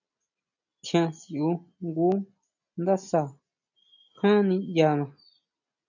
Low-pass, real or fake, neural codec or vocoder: 7.2 kHz; real; none